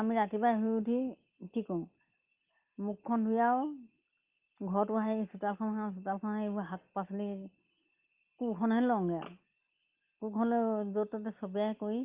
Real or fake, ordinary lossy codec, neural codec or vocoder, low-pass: real; Opus, 32 kbps; none; 3.6 kHz